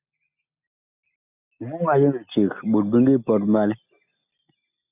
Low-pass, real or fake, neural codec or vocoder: 3.6 kHz; real; none